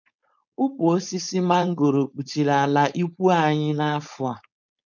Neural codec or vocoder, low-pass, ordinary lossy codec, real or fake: codec, 16 kHz, 4.8 kbps, FACodec; 7.2 kHz; none; fake